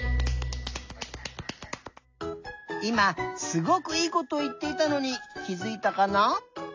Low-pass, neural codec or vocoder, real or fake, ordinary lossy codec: 7.2 kHz; none; real; AAC, 48 kbps